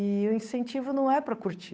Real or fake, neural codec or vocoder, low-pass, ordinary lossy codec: fake; codec, 16 kHz, 8 kbps, FunCodec, trained on Chinese and English, 25 frames a second; none; none